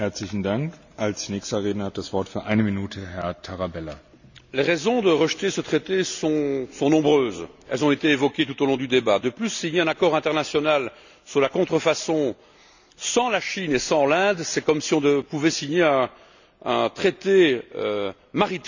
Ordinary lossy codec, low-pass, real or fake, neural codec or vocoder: none; 7.2 kHz; real; none